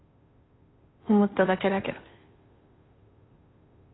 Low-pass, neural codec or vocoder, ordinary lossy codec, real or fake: 7.2 kHz; codec, 16 kHz, 2 kbps, FunCodec, trained on Chinese and English, 25 frames a second; AAC, 16 kbps; fake